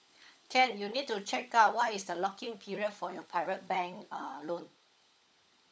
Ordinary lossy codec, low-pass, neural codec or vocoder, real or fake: none; none; codec, 16 kHz, 16 kbps, FunCodec, trained on LibriTTS, 50 frames a second; fake